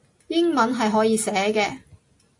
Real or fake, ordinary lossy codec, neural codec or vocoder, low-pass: real; AAC, 48 kbps; none; 10.8 kHz